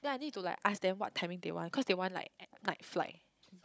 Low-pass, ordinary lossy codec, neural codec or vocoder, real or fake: none; none; codec, 16 kHz, 16 kbps, FunCodec, trained on Chinese and English, 50 frames a second; fake